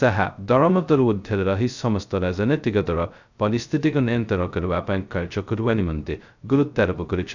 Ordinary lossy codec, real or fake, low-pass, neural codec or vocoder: none; fake; 7.2 kHz; codec, 16 kHz, 0.2 kbps, FocalCodec